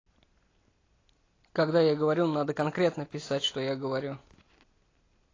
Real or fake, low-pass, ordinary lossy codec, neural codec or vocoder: real; 7.2 kHz; AAC, 32 kbps; none